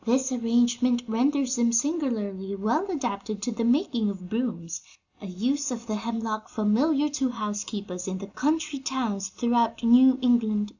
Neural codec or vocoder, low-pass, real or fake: none; 7.2 kHz; real